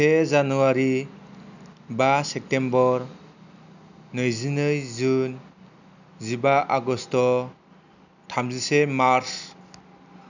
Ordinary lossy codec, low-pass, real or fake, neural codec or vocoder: none; 7.2 kHz; real; none